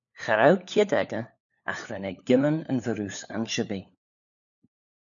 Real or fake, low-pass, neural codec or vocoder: fake; 7.2 kHz; codec, 16 kHz, 4 kbps, FunCodec, trained on LibriTTS, 50 frames a second